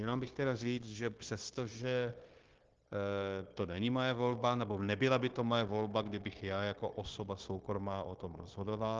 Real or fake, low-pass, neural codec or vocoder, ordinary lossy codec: fake; 7.2 kHz; codec, 16 kHz, 0.9 kbps, LongCat-Audio-Codec; Opus, 16 kbps